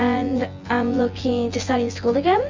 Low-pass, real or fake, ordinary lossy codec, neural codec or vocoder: 7.2 kHz; fake; Opus, 32 kbps; vocoder, 24 kHz, 100 mel bands, Vocos